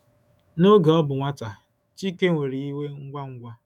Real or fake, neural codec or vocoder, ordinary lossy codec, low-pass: fake; autoencoder, 48 kHz, 128 numbers a frame, DAC-VAE, trained on Japanese speech; none; 19.8 kHz